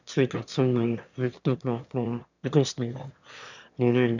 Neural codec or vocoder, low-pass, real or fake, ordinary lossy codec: autoencoder, 22.05 kHz, a latent of 192 numbers a frame, VITS, trained on one speaker; 7.2 kHz; fake; none